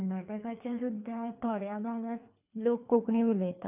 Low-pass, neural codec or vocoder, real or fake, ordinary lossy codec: 3.6 kHz; codec, 24 kHz, 3 kbps, HILCodec; fake; none